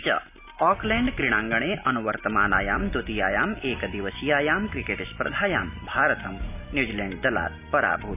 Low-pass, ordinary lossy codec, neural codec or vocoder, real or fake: 3.6 kHz; none; none; real